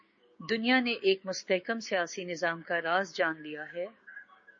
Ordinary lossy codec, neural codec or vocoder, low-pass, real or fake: MP3, 32 kbps; codec, 16 kHz, 6 kbps, DAC; 7.2 kHz; fake